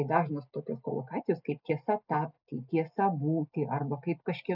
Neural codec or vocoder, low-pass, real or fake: none; 5.4 kHz; real